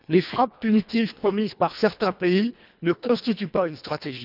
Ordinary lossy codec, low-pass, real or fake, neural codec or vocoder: none; 5.4 kHz; fake; codec, 24 kHz, 1.5 kbps, HILCodec